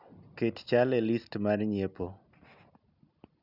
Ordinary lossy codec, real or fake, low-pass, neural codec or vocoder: none; real; 5.4 kHz; none